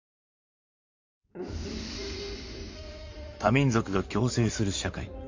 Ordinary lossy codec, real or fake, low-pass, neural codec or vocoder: MP3, 48 kbps; fake; 7.2 kHz; codec, 16 kHz in and 24 kHz out, 2.2 kbps, FireRedTTS-2 codec